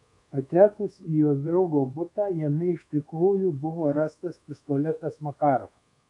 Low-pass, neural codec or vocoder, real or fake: 10.8 kHz; codec, 24 kHz, 1.2 kbps, DualCodec; fake